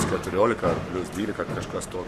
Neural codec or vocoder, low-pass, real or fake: codec, 44.1 kHz, 7.8 kbps, Pupu-Codec; 14.4 kHz; fake